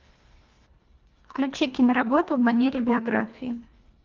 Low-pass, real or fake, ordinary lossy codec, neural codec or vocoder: 7.2 kHz; fake; Opus, 24 kbps; codec, 24 kHz, 1.5 kbps, HILCodec